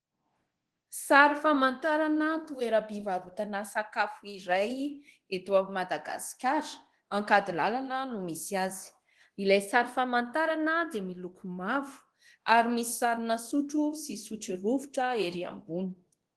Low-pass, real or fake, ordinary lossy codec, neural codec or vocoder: 10.8 kHz; fake; Opus, 16 kbps; codec, 24 kHz, 0.9 kbps, DualCodec